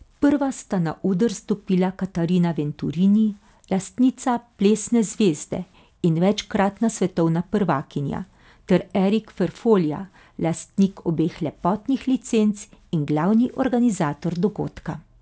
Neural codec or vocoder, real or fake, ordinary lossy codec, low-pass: none; real; none; none